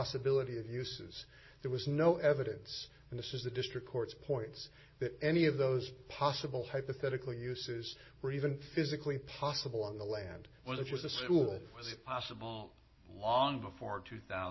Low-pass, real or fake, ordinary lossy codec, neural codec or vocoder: 7.2 kHz; real; MP3, 24 kbps; none